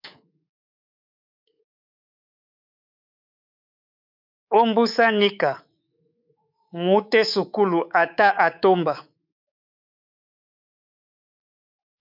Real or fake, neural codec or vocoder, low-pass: fake; codec, 24 kHz, 3.1 kbps, DualCodec; 5.4 kHz